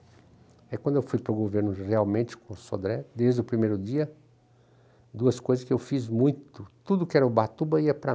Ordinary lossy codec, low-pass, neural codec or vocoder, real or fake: none; none; none; real